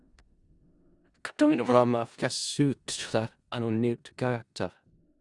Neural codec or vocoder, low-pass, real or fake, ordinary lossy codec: codec, 16 kHz in and 24 kHz out, 0.4 kbps, LongCat-Audio-Codec, four codebook decoder; 10.8 kHz; fake; Opus, 64 kbps